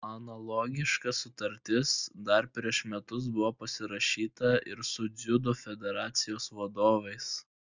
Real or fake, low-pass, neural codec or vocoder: real; 7.2 kHz; none